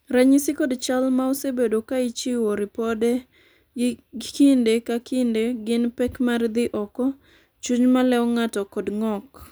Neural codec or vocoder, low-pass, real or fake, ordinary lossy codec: none; none; real; none